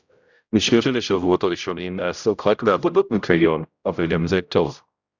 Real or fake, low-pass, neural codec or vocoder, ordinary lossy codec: fake; 7.2 kHz; codec, 16 kHz, 0.5 kbps, X-Codec, HuBERT features, trained on general audio; Opus, 64 kbps